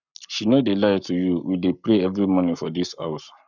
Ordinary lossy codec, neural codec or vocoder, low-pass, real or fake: none; codec, 44.1 kHz, 7.8 kbps, Pupu-Codec; 7.2 kHz; fake